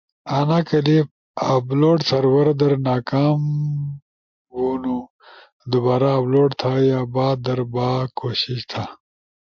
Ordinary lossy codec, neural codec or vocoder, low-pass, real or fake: MP3, 64 kbps; none; 7.2 kHz; real